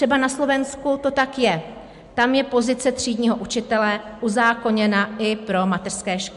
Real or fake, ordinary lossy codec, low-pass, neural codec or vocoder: real; MP3, 64 kbps; 10.8 kHz; none